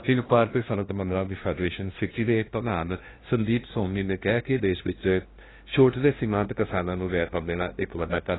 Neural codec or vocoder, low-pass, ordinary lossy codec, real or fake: codec, 16 kHz, 0.5 kbps, FunCodec, trained on LibriTTS, 25 frames a second; 7.2 kHz; AAC, 16 kbps; fake